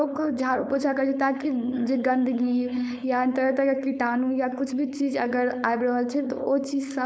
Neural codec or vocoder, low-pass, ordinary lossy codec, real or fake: codec, 16 kHz, 4.8 kbps, FACodec; none; none; fake